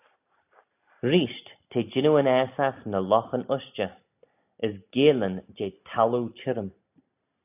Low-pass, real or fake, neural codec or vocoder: 3.6 kHz; real; none